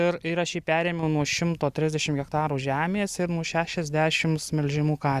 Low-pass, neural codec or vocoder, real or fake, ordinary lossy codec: 14.4 kHz; none; real; Opus, 64 kbps